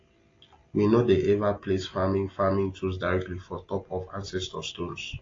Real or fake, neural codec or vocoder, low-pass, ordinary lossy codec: real; none; 7.2 kHz; AAC, 32 kbps